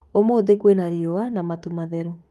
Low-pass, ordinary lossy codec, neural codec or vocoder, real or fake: 14.4 kHz; Opus, 32 kbps; autoencoder, 48 kHz, 32 numbers a frame, DAC-VAE, trained on Japanese speech; fake